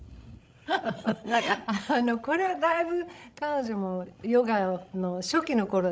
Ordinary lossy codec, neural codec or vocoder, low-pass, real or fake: none; codec, 16 kHz, 16 kbps, FreqCodec, larger model; none; fake